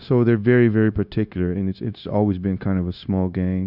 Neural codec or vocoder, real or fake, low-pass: codec, 16 kHz, 0.9 kbps, LongCat-Audio-Codec; fake; 5.4 kHz